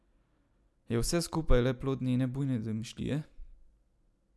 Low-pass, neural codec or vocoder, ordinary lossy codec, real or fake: none; none; none; real